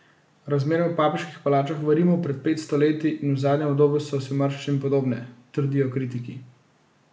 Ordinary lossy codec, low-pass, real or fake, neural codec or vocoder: none; none; real; none